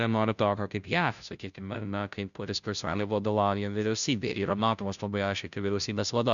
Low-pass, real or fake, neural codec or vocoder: 7.2 kHz; fake; codec, 16 kHz, 0.5 kbps, FunCodec, trained on Chinese and English, 25 frames a second